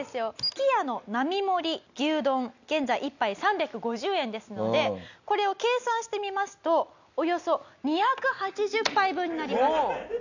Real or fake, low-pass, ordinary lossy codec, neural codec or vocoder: real; 7.2 kHz; none; none